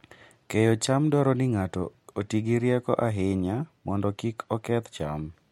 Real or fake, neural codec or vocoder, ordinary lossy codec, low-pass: real; none; MP3, 64 kbps; 19.8 kHz